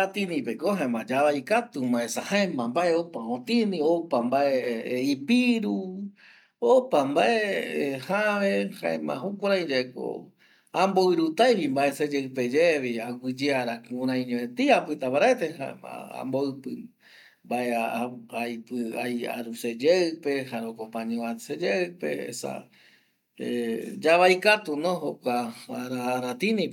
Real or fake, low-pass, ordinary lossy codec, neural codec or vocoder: real; 19.8 kHz; none; none